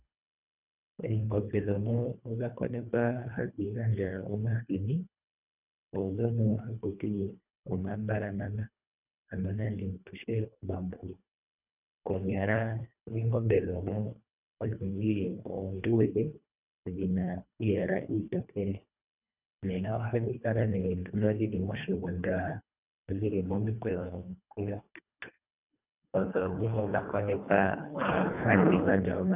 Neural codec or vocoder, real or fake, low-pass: codec, 24 kHz, 1.5 kbps, HILCodec; fake; 3.6 kHz